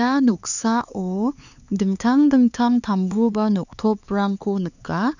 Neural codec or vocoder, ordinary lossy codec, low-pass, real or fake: codec, 16 kHz, 4 kbps, X-Codec, HuBERT features, trained on LibriSpeech; none; 7.2 kHz; fake